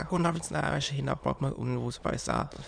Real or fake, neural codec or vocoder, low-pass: fake; autoencoder, 22.05 kHz, a latent of 192 numbers a frame, VITS, trained on many speakers; 9.9 kHz